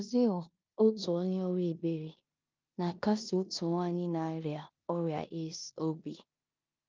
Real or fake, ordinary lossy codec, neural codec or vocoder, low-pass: fake; Opus, 24 kbps; codec, 16 kHz in and 24 kHz out, 0.9 kbps, LongCat-Audio-Codec, four codebook decoder; 7.2 kHz